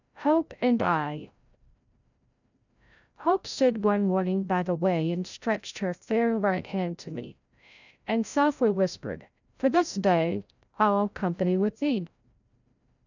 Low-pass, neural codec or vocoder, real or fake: 7.2 kHz; codec, 16 kHz, 0.5 kbps, FreqCodec, larger model; fake